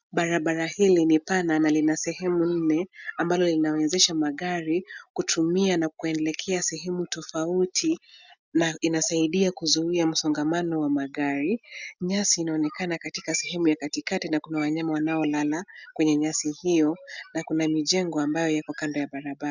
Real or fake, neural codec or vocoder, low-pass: real; none; 7.2 kHz